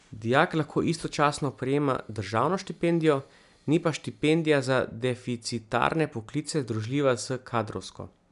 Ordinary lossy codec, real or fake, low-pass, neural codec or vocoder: none; real; 10.8 kHz; none